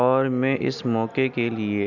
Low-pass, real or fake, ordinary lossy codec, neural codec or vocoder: 7.2 kHz; real; none; none